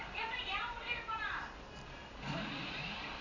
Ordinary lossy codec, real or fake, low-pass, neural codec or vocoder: none; fake; 7.2 kHz; vocoder, 44.1 kHz, 128 mel bands every 256 samples, BigVGAN v2